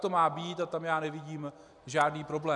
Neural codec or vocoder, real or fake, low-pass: none; real; 10.8 kHz